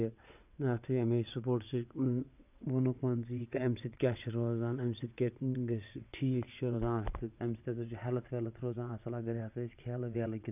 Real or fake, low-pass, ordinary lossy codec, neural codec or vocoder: fake; 3.6 kHz; none; vocoder, 22.05 kHz, 80 mel bands, WaveNeXt